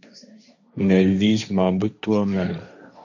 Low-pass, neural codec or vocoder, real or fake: 7.2 kHz; codec, 16 kHz, 1.1 kbps, Voila-Tokenizer; fake